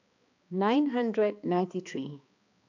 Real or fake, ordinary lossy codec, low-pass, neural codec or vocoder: fake; AAC, 48 kbps; 7.2 kHz; codec, 16 kHz, 2 kbps, X-Codec, HuBERT features, trained on balanced general audio